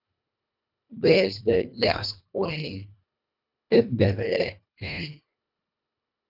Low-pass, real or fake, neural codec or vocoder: 5.4 kHz; fake; codec, 24 kHz, 1.5 kbps, HILCodec